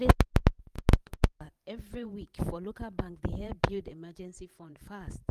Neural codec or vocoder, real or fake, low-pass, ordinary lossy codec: vocoder, 44.1 kHz, 128 mel bands every 512 samples, BigVGAN v2; fake; 14.4 kHz; Opus, 32 kbps